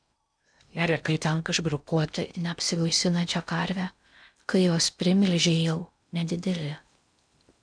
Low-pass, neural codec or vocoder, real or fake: 9.9 kHz; codec, 16 kHz in and 24 kHz out, 0.6 kbps, FocalCodec, streaming, 4096 codes; fake